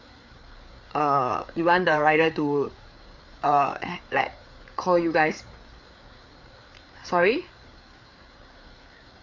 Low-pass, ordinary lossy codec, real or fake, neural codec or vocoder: 7.2 kHz; MP3, 48 kbps; fake; codec, 16 kHz, 4 kbps, FreqCodec, larger model